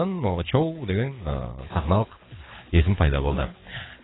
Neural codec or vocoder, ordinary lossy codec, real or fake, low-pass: codec, 24 kHz, 6 kbps, HILCodec; AAC, 16 kbps; fake; 7.2 kHz